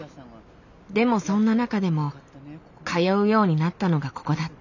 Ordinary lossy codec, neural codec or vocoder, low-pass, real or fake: none; none; 7.2 kHz; real